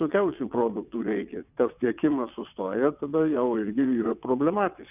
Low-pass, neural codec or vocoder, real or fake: 3.6 kHz; vocoder, 22.05 kHz, 80 mel bands, WaveNeXt; fake